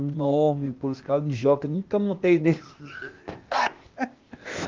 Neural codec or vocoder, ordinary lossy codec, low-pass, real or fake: codec, 16 kHz, 0.8 kbps, ZipCodec; Opus, 32 kbps; 7.2 kHz; fake